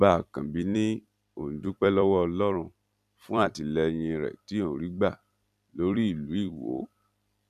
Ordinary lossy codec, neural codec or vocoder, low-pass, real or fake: none; vocoder, 44.1 kHz, 128 mel bands every 256 samples, BigVGAN v2; 14.4 kHz; fake